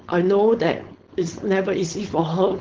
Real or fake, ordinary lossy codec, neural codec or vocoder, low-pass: fake; Opus, 16 kbps; codec, 16 kHz, 4.8 kbps, FACodec; 7.2 kHz